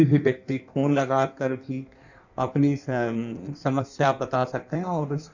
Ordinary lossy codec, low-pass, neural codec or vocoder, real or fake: none; 7.2 kHz; codec, 16 kHz in and 24 kHz out, 1.1 kbps, FireRedTTS-2 codec; fake